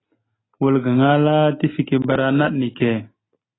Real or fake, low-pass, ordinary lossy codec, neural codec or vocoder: real; 7.2 kHz; AAC, 16 kbps; none